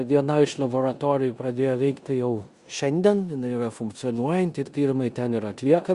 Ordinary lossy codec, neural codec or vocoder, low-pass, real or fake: Opus, 64 kbps; codec, 16 kHz in and 24 kHz out, 0.9 kbps, LongCat-Audio-Codec, four codebook decoder; 10.8 kHz; fake